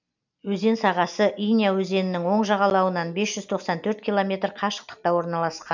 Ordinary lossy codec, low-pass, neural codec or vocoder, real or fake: none; 7.2 kHz; none; real